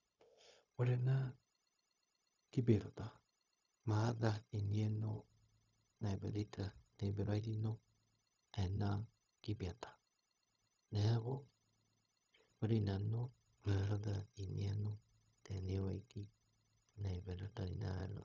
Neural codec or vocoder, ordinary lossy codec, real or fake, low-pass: codec, 16 kHz, 0.4 kbps, LongCat-Audio-Codec; none; fake; 7.2 kHz